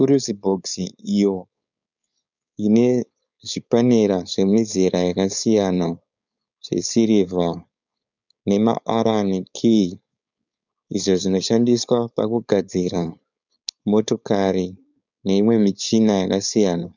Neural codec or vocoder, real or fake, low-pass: codec, 16 kHz, 4.8 kbps, FACodec; fake; 7.2 kHz